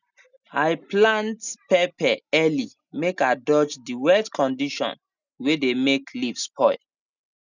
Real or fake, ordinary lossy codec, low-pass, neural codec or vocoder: real; none; 7.2 kHz; none